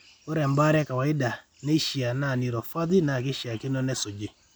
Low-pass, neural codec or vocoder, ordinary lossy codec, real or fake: none; none; none; real